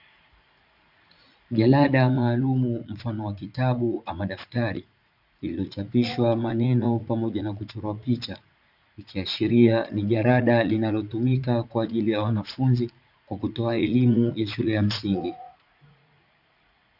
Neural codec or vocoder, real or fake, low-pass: vocoder, 44.1 kHz, 80 mel bands, Vocos; fake; 5.4 kHz